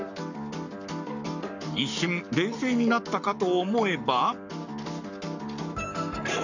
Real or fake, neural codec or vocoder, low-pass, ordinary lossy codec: fake; codec, 44.1 kHz, 7.8 kbps, Pupu-Codec; 7.2 kHz; none